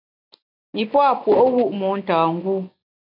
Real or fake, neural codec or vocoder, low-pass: real; none; 5.4 kHz